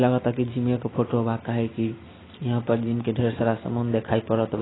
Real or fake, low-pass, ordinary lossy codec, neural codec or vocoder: real; 7.2 kHz; AAC, 16 kbps; none